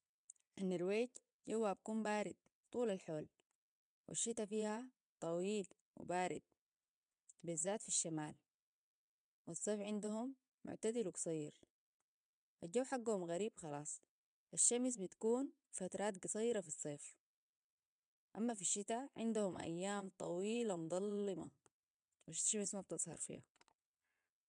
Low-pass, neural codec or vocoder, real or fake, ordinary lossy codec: 9.9 kHz; vocoder, 22.05 kHz, 80 mel bands, Vocos; fake; none